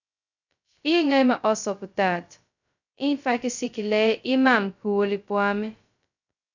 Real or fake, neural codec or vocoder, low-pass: fake; codec, 16 kHz, 0.2 kbps, FocalCodec; 7.2 kHz